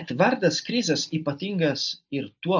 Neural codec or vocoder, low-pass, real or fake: none; 7.2 kHz; real